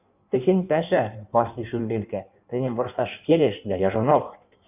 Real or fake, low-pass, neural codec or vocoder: fake; 3.6 kHz; codec, 16 kHz in and 24 kHz out, 1.1 kbps, FireRedTTS-2 codec